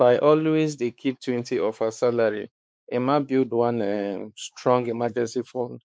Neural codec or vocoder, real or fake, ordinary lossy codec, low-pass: codec, 16 kHz, 4 kbps, X-Codec, WavLM features, trained on Multilingual LibriSpeech; fake; none; none